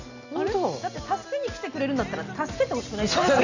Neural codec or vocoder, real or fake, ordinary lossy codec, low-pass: none; real; none; 7.2 kHz